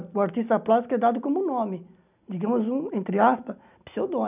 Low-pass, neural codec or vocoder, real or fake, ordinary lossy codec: 3.6 kHz; none; real; none